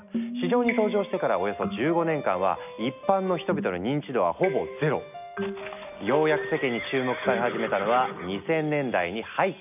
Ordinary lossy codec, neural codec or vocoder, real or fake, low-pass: none; none; real; 3.6 kHz